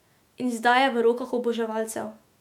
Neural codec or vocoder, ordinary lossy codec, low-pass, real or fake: autoencoder, 48 kHz, 128 numbers a frame, DAC-VAE, trained on Japanese speech; MP3, 96 kbps; 19.8 kHz; fake